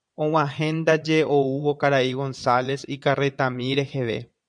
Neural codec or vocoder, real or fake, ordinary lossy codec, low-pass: vocoder, 22.05 kHz, 80 mel bands, Vocos; fake; MP3, 96 kbps; 9.9 kHz